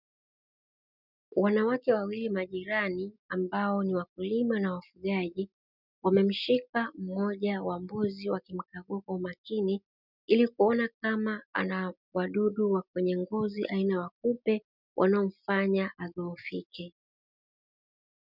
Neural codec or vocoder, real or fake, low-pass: none; real; 5.4 kHz